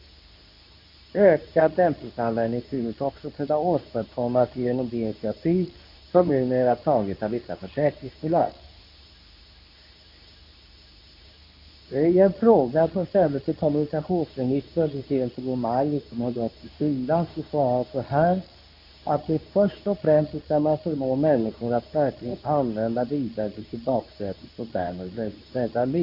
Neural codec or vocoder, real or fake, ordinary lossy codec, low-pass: codec, 24 kHz, 0.9 kbps, WavTokenizer, medium speech release version 2; fake; AAC, 48 kbps; 5.4 kHz